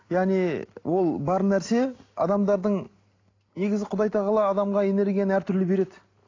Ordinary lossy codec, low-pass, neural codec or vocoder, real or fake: AAC, 32 kbps; 7.2 kHz; none; real